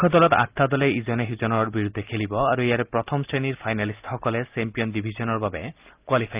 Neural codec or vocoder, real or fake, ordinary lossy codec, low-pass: none; real; Opus, 24 kbps; 3.6 kHz